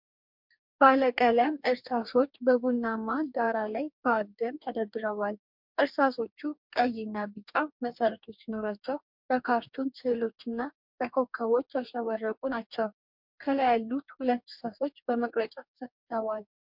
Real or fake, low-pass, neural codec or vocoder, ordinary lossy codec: fake; 5.4 kHz; codec, 44.1 kHz, 2.6 kbps, DAC; MP3, 48 kbps